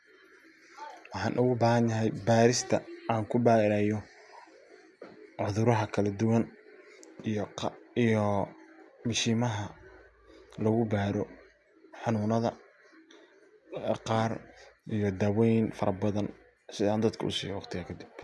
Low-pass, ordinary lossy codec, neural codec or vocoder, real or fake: none; none; none; real